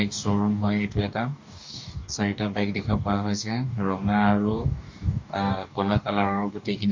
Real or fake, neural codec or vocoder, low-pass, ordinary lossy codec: fake; codec, 44.1 kHz, 2.6 kbps, DAC; 7.2 kHz; MP3, 48 kbps